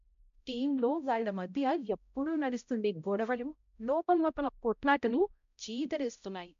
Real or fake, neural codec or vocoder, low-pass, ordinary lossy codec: fake; codec, 16 kHz, 0.5 kbps, X-Codec, HuBERT features, trained on balanced general audio; 7.2 kHz; MP3, 64 kbps